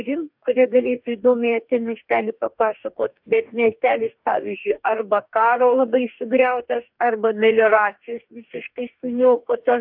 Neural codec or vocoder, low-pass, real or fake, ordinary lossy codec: codec, 44.1 kHz, 2.6 kbps, DAC; 5.4 kHz; fake; AAC, 48 kbps